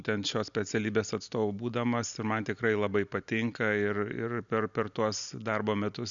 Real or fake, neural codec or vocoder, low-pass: real; none; 7.2 kHz